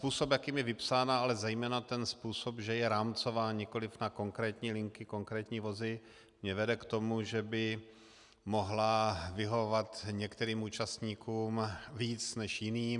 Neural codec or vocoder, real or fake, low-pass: none; real; 10.8 kHz